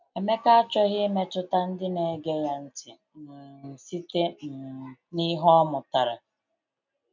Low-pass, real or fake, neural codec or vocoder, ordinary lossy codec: 7.2 kHz; real; none; MP3, 64 kbps